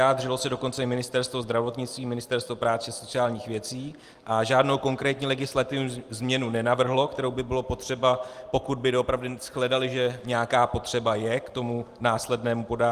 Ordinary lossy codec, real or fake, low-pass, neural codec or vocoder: Opus, 24 kbps; real; 14.4 kHz; none